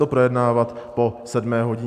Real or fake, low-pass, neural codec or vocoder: real; 14.4 kHz; none